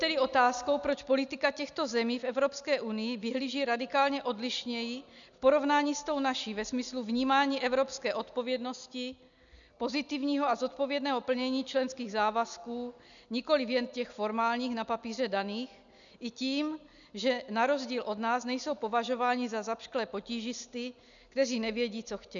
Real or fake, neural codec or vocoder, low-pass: real; none; 7.2 kHz